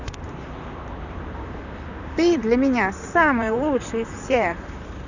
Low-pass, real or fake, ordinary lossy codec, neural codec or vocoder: 7.2 kHz; fake; none; vocoder, 44.1 kHz, 128 mel bands, Pupu-Vocoder